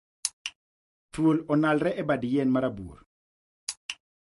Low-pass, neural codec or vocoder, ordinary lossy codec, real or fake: 14.4 kHz; none; MP3, 48 kbps; real